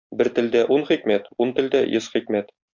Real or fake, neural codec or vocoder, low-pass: real; none; 7.2 kHz